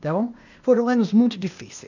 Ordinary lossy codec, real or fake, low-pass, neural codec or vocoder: none; fake; 7.2 kHz; codec, 16 kHz, 0.8 kbps, ZipCodec